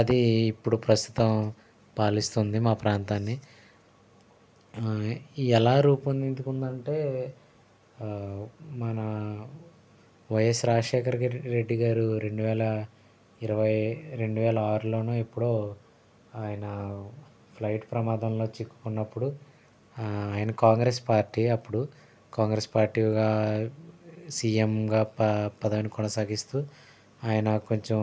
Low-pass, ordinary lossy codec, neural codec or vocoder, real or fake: none; none; none; real